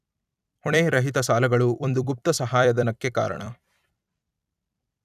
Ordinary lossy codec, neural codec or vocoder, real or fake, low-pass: none; vocoder, 44.1 kHz, 128 mel bands every 256 samples, BigVGAN v2; fake; 14.4 kHz